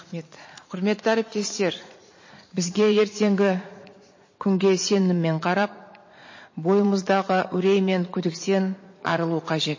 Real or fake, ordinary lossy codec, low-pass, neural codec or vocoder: fake; MP3, 32 kbps; 7.2 kHz; vocoder, 44.1 kHz, 80 mel bands, Vocos